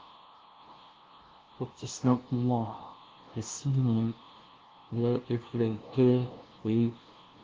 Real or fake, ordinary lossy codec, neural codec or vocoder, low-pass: fake; Opus, 16 kbps; codec, 16 kHz, 0.5 kbps, FunCodec, trained on LibriTTS, 25 frames a second; 7.2 kHz